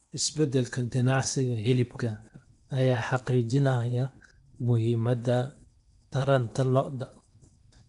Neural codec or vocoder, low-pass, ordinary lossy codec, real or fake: codec, 16 kHz in and 24 kHz out, 0.8 kbps, FocalCodec, streaming, 65536 codes; 10.8 kHz; none; fake